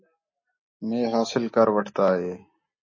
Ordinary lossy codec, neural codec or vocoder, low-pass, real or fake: MP3, 32 kbps; none; 7.2 kHz; real